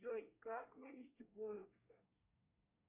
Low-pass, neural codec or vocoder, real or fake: 3.6 kHz; codec, 24 kHz, 1 kbps, SNAC; fake